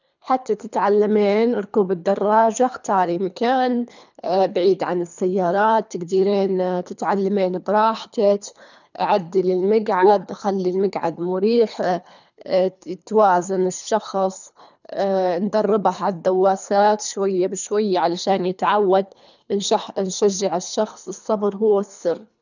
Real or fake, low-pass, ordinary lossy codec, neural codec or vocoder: fake; 7.2 kHz; none; codec, 24 kHz, 3 kbps, HILCodec